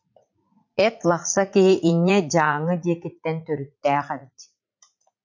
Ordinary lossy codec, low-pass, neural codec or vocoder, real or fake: MP3, 64 kbps; 7.2 kHz; vocoder, 44.1 kHz, 80 mel bands, Vocos; fake